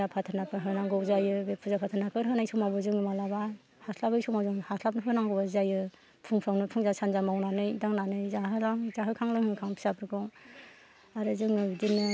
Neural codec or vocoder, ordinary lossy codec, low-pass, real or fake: none; none; none; real